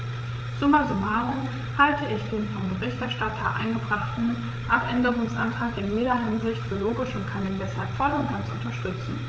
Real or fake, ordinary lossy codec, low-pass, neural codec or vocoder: fake; none; none; codec, 16 kHz, 8 kbps, FreqCodec, larger model